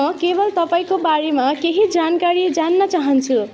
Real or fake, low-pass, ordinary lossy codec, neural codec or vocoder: real; none; none; none